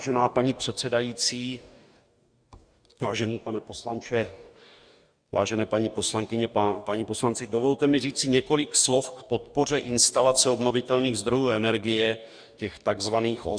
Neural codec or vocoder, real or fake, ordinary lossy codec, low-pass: codec, 44.1 kHz, 2.6 kbps, DAC; fake; Opus, 64 kbps; 9.9 kHz